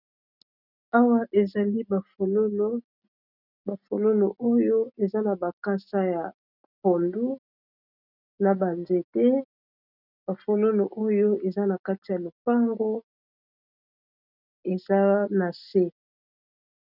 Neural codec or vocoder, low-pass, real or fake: none; 5.4 kHz; real